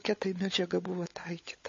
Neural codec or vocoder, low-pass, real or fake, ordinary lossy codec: codec, 16 kHz, 16 kbps, FunCodec, trained on LibriTTS, 50 frames a second; 7.2 kHz; fake; MP3, 32 kbps